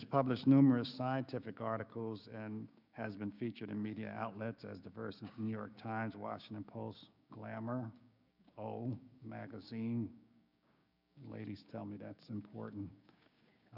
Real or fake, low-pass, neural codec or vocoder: fake; 5.4 kHz; codec, 16 kHz, 6 kbps, DAC